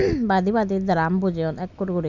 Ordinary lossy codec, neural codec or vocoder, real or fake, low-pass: none; none; real; 7.2 kHz